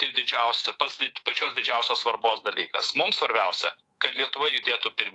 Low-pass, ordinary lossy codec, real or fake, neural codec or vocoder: 10.8 kHz; AAC, 48 kbps; fake; codec, 24 kHz, 3.1 kbps, DualCodec